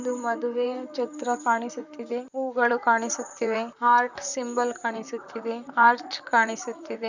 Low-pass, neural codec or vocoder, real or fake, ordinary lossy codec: 7.2 kHz; vocoder, 44.1 kHz, 128 mel bands, Pupu-Vocoder; fake; none